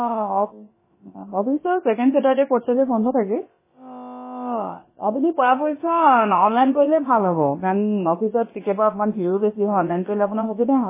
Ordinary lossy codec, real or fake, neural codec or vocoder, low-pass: MP3, 16 kbps; fake; codec, 16 kHz, about 1 kbps, DyCAST, with the encoder's durations; 3.6 kHz